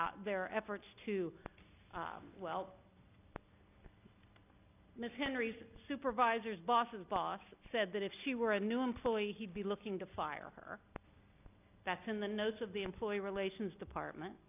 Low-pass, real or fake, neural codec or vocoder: 3.6 kHz; real; none